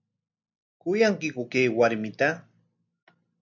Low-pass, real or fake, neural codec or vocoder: 7.2 kHz; real; none